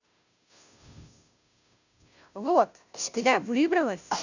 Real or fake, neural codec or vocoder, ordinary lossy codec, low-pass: fake; codec, 16 kHz, 0.5 kbps, FunCodec, trained on Chinese and English, 25 frames a second; none; 7.2 kHz